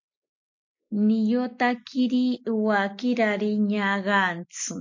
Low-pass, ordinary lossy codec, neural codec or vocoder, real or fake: 7.2 kHz; MP3, 48 kbps; none; real